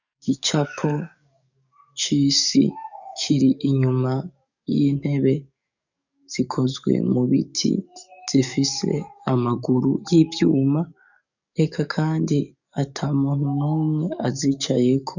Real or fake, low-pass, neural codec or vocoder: fake; 7.2 kHz; codec, 44.1 kHz, 7.8 kbps, DAC